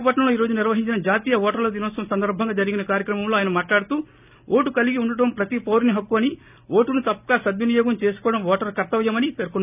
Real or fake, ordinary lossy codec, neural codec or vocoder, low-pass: real; none; none; 3.6 kHz